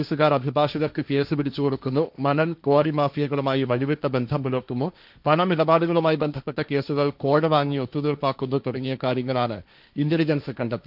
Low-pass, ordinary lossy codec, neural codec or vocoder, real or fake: 5.4 kHz; none; codec, 16 kHz, 1.1 kbps, Voila-Tokenizer; fake